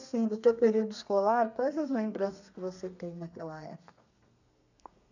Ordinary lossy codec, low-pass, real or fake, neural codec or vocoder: none; 7.2 kHz; fake; codec, 32 kHz, 1.9 kbps, SNAC